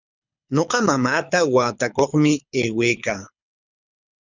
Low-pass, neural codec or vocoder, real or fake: 7.2 kHz; codec, 24 kHz, 6 kbps, HILCodec; fake